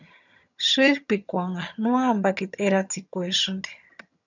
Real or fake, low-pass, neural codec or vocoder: fake; 7.2 kHz; vocoder, 22.05 kHz, 80 mel bands, HiFi-GAN